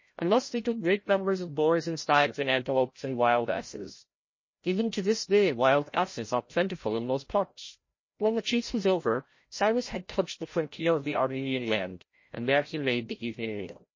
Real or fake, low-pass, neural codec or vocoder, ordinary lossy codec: fake; 7.2 kHz; codec, 16 kHz, 0.5 kbps, FreqCodec, larger model; MP3, 32 kbps